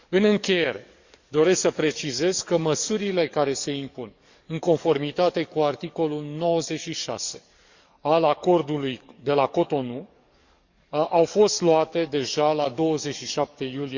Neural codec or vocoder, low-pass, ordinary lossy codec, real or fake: codec, 44.1 kHz, 7.8 kbps, DAC; 7.2 kHz; Opus, 64 kbps; fake